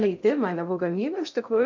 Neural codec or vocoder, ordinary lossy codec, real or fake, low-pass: codec, 16 kHz in and 24 kHz out, 0.6 kbps, FocalCodec, streaming, 2048 codes; MP3, 64 kbps; fake; 7.2 kHz